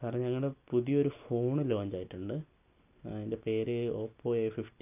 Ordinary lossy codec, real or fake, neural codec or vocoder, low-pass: none; real; none; 3.6 kHz